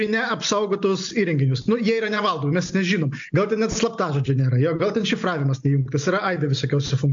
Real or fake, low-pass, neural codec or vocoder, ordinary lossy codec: real; 7.2 kHz; none; AAC, 64 kbps